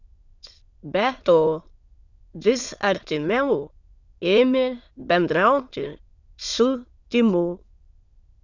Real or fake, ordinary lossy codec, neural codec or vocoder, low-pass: fake; Opus, 64 kbps; autoencoder, 22.05 kHz, a latent of 192 numbers a frame, VITS, trained on many speakers; 7.2 kHz